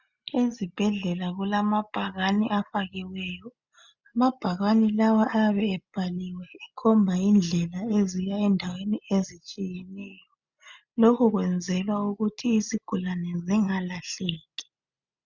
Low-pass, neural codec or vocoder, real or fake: 7.2 kHz; none; real